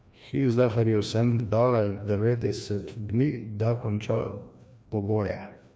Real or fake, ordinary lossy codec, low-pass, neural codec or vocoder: fake; none; none; codec, 16 kHz, 1 kbps, FreqCodec, larger model